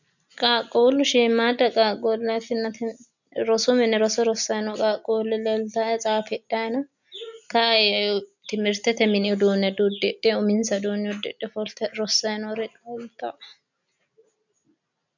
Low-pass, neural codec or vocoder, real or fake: 7.2 kHz; none; real